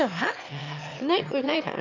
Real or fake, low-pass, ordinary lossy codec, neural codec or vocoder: fake; 7.2 kHz; none; autoencoder, 22.05 kHz, a latent of 192 numbers a frame, VITS, trained on one speaker